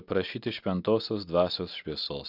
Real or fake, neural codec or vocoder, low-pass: real; none; 5.4 kHz